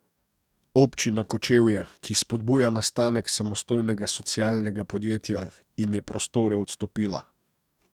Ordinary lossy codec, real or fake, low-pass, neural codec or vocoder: none; fake; 19.8 kHz; codec, 44.1 kHz, 2.6 kbps, DAC